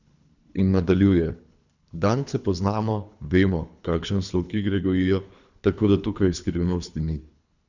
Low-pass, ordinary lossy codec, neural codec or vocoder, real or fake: 7.2 kHz; none; codec, 24 kHz, 3 kbps, HILCodec; fake